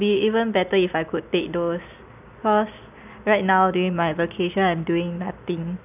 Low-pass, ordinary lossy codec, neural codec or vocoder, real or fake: 3.6 kHz; none; none; real